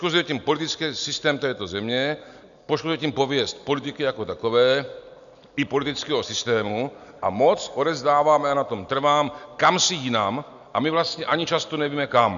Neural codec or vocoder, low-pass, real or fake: none; 7.2 kHz; real